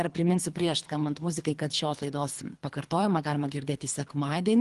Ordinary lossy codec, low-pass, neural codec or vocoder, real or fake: Opus, 24 kbps; 10.8 kHz; codec, 24 kHz, 3 kbps, HILCodec; fake